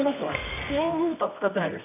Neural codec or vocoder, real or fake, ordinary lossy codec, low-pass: codec, 24 kHz, 0.9 kbps, WavTokenizer, medium music audio release; fake; none; 3.6 kHz